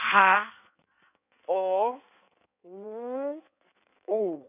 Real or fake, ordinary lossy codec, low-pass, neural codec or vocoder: fake; none; 3.6 kHz; codec, 16 kHz in and 24 kHz out, 1.1 kbps, FireRedTTS-2 codec